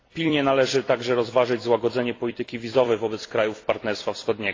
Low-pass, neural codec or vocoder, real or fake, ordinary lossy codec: 7.2 kHz; vocoder, 44.1 kHz, 128 mel bands every 256 samples, BigVGAN v2; fake; AAC, 32 kbps